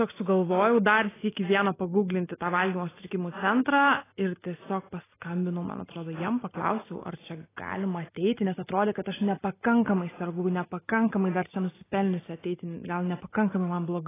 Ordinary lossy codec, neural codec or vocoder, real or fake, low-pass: AAC, 16 kbps; none; real; 3.6 kHz